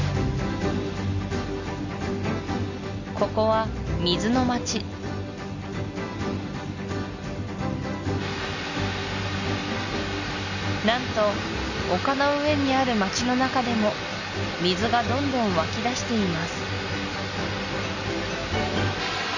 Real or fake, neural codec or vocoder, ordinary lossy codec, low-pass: real; none; none; 7.2 kHz